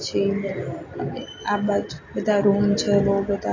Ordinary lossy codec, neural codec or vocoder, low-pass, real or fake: AAC, 32 kbps; none; 7.2 kHz; real